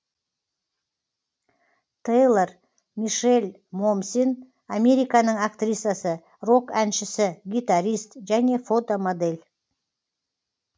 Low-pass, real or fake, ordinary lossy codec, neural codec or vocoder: none; real; none; none